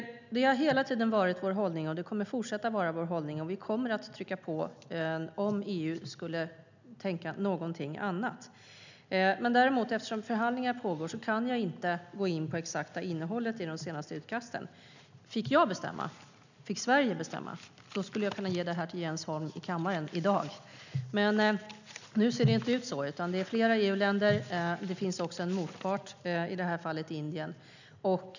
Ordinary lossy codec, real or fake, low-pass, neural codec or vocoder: none; real; 7.2 kHz; none